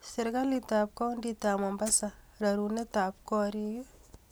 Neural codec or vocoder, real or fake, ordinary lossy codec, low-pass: none; real; none; none